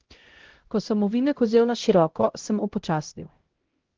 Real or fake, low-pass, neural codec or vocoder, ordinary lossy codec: fake; 7.2 kHz; codec, 16 kHz, 0.5 kbps, X-Codec, HuBERT features, trained on LibriSpeech; Opus, 16 kbps